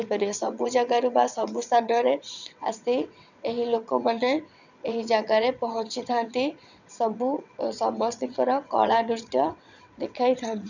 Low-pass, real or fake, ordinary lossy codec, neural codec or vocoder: 7.2 kHz; fake; none; vocoder, 22.05 kHz, 80 mel bands, WaveNeXt